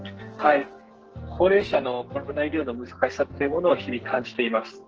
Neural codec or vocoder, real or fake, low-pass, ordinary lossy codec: codec, 44.1 kHz, 2.6 kbps, SNAC; fake; 7.2 kHz; Opus, 16 kbps